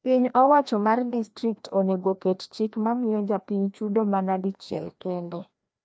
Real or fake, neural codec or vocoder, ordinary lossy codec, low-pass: fake; codec, 16 kHz, 1 kbps, FreqCodec, larger model; none; none